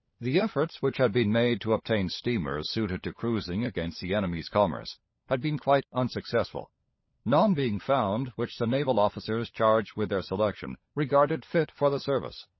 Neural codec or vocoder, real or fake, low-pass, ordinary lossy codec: codec, 16 kHz, 16 kbps, FunCodec, trained on LibriTTS, 50 frames a second; fake; 7.2 kHz; MP3, 24 kbps